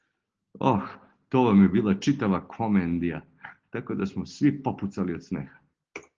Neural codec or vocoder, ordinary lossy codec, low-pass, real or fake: none; Opus, 16 kbps; 7.2 kHz; real